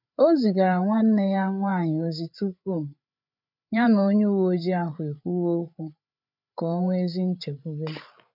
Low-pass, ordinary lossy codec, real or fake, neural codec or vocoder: 5.4 kHz; none; fake; codec, 16 kHz, 8 kbps, FreqCodec, larger model